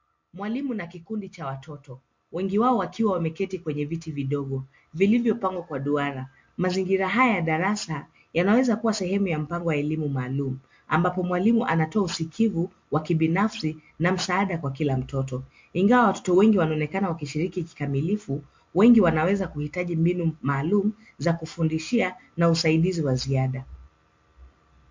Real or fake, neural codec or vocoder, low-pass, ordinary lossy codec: real; none; 7.2 kHz; MP3, 48 kbps